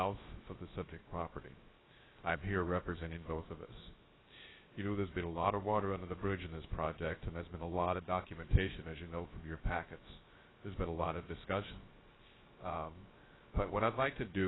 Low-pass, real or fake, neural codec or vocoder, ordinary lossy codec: 7.2 kHz; fake; codec, 16 kHz, 0.3 kbps, FocalCodec; AAC, 16 kbps